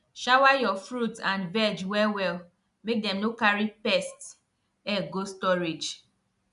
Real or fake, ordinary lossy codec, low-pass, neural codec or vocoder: real; MP3, 96 kbps; 10.8 kHz; none